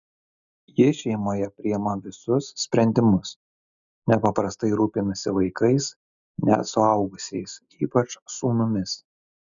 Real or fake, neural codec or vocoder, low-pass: real; none; 7.2 kHz